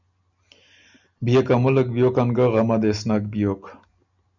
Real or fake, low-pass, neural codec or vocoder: real; 7.2 kHz; none